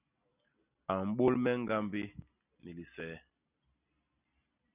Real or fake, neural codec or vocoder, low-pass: real; none; 3.6 kHz